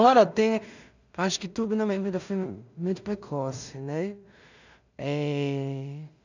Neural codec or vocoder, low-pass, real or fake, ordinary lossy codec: codec, 16 kHz in and 24 kHz out, 0.4 kbps, LongCat-Audio-Codec, two codebook decoder; 7.2 kHz; fake; none